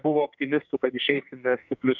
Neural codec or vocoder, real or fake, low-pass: codec, 44.1 kHz, 2.6 kbps, SNAC; fake; 7.2 kHz